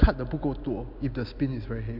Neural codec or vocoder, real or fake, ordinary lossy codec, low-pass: none; real; MP3, 48 kbps; 5.4 kHz